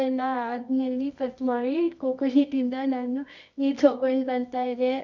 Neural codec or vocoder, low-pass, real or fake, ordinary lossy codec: codec, 24 kHz, 0.9 kbps, WavTokenizer, medium music audio release; 7.2 kHz; fake; none